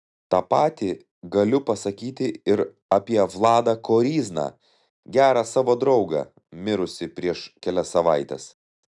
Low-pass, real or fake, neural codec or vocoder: 10.8 kHz; real; none